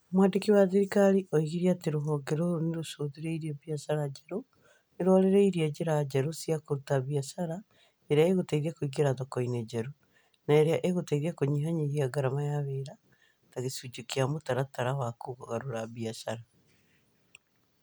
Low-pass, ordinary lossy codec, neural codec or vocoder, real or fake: none; none; none; real